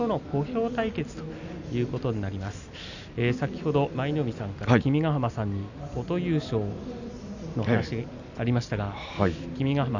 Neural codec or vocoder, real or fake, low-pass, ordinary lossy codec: none; real; 7.2 kHz; none